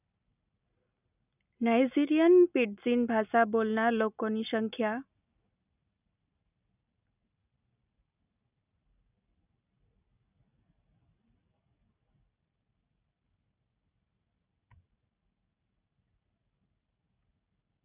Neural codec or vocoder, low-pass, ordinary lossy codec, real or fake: none; 3.6 kHz; none; real